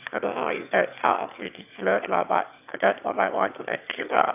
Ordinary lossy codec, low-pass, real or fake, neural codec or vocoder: none; 3.6 kHz; fake; autoencoder, 22.05 kHz, a latent of 192 numbers a frame, VITS, trained on one speaker